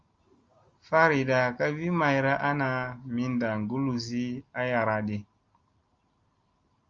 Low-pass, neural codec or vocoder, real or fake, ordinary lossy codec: 7.2 kHz; none; real; Opus, 32 kbps